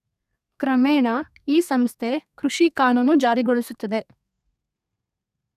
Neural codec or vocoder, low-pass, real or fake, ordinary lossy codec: codec, 32 kHz, 1.9 kbps, SNAC; 14.4 kHz; fake; none